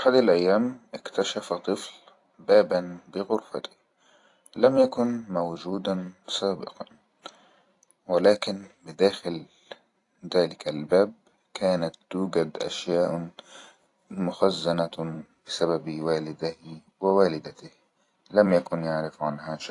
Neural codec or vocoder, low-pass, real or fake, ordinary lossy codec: vocoder, 44.1 kHz, 128 mel bands every 256 samples, BigVGAN v2; 10.8 kHz; fake; AAC, 48 kbps